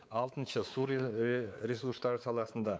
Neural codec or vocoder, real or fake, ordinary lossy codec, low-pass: codec, 16 kHz, 4 kbps, X-Codec, WavLM features, trained on Multilingual LibriSpeech; fake; none; none